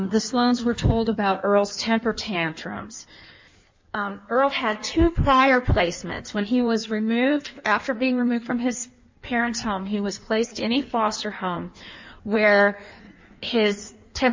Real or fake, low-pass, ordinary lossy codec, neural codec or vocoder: fake; 7.2 kHz; MP3, 64 kbps; codec, 16 kHz in and 24 kHz out, 1.1 kbps, FireRedTTS-2 codec